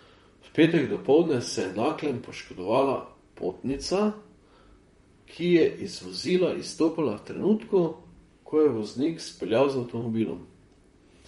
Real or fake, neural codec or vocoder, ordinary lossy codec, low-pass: fake; vocoder, 44.1 kHz, 128 mel bands, Pupu-Vocoder; MP3, 48 kbps; 19.8 kHz